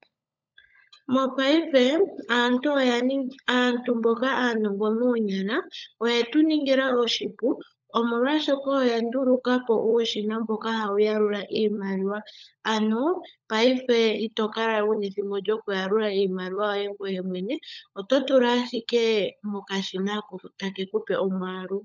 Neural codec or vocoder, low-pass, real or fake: codec, 16 kHz, 16 kbps, FunCodec, trained on LibriTTS, 50 frames a second; 7.2 kHz; fake